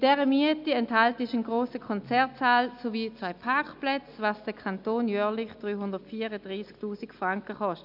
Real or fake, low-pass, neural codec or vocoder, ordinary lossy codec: real; 5.4 kHz; none; none